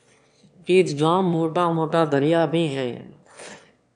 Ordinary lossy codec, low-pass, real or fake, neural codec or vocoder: MP3, 96 kbps; 9.9 kHz; fake; autoencoder, 22.05 kHz, a latent of 192 numbers a frame, VITS, trained on one speaker